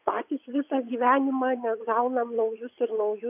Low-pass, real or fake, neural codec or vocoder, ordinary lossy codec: 3.6 kHz; fake; vocoder, 22.05 kHz, 80 mel bands, WaveNeXt; AAC, 32 kbps